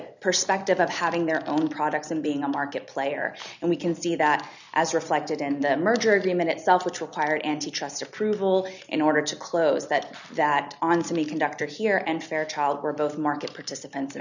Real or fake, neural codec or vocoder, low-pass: real; none; 7.2 kHz